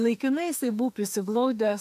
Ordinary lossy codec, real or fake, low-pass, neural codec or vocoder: AAC, 96 kbps; fake; 14.4 kHz; autoencoder, 48 kHz, 32 numbers a frame, DAC-VAE, trained on Japanese speech